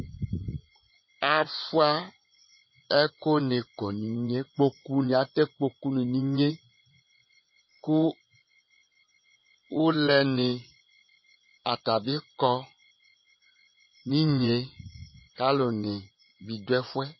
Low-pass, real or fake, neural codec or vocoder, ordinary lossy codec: 7.2 kHz; fake; vocoder, 24 kHz, 100 mel bands, Vocos; MP3, 24 kbps